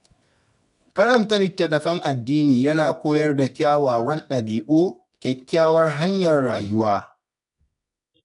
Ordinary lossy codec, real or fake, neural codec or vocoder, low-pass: none; fake; codec, 24 kHz, 0.9 kbps, WavTokenizer, medium music audio release; 10.8 kHz